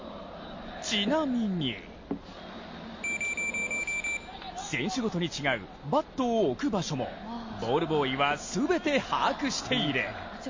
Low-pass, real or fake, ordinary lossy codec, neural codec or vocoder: 7.2 kHz; real; MP3, 32 kbps; none